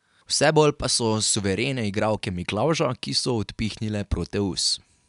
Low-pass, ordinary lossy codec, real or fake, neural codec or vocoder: 10.8 kHz; none; real; none